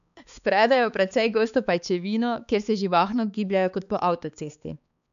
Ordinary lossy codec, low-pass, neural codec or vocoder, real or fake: none; 7.2 kHz; codec, 16 kHz, 4 kbps, X-Codec, HuBERT features, trained on balanced general audio; fake